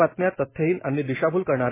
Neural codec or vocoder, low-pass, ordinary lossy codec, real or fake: none; 3.6 kHz; MP3, 16 kbps; real